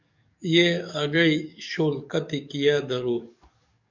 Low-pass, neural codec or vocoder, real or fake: 7.2 kHz; codec, 44.1 kHz, 7.8 kbps, DAC; fake